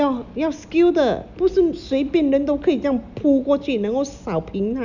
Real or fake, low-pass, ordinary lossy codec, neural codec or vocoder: real; 7.2 kHz; none; none